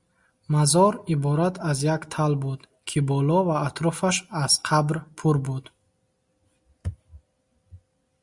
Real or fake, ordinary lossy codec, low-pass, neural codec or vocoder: real; Opus, 64 kbps; 10.8 kHz; none